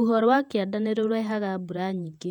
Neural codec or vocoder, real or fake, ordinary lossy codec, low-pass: vocoder, 48 kHz, 128 mel bands, Vocos; fake; none; 19.8 kHz